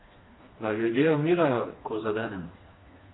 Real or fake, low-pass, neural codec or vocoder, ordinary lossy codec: fake; 7.2 kHz; codec, 16 kHz, 2 kbps, FreqCodec, smaller model; AAC, 16 kbps